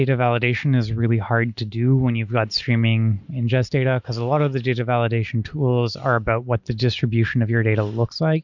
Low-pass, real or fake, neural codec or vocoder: 7.2 kHz; real; none